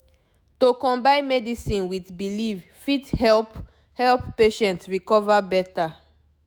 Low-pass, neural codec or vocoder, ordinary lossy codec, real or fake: none; autoencoder, 48 kHz, 128 numbers a frame, DAC-VAE, trained on Japanese speech; none; fake